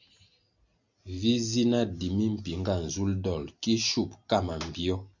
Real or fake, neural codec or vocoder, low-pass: real; none; 7.2 kHz